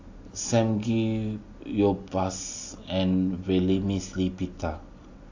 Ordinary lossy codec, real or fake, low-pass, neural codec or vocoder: AAC, 48 kbps; real; 7.2 kHz; none